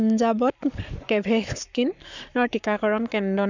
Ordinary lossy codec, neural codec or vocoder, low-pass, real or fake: none; codec, 44.1 kHz, 7.8 kbps, Pupu-Codec; 7.2 kHz; fake